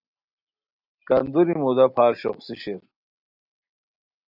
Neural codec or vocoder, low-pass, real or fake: none; 5.4 kHz; real